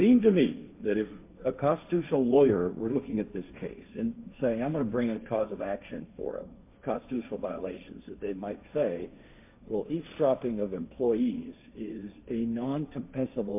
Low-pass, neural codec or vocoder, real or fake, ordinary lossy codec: 3.6 kHz; codec, 16 kHz, 1.1 kbps, Voila-Tokenizer; fake; MP3, 32 kbps